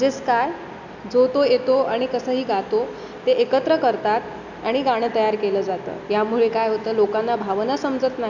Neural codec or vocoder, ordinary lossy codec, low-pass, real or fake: none; none; 7.2 kHz; real